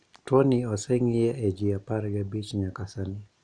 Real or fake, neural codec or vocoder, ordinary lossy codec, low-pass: real; none; none; 9.9 kHz